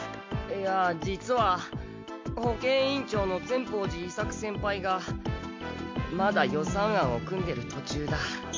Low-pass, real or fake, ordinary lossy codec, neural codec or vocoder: 7.2 kHz; real; MP3, 48 kbps; none